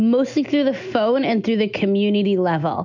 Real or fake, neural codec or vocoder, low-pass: real; none; 7.2 kHz